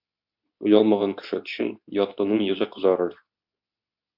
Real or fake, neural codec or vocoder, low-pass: fake; codec, 24 kHz, 0.9 kbps, WavTokenizer, medium speech release version 2; 5.4 kHz